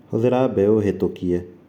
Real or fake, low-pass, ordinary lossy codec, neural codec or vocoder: real; 19.8 kHz; none; none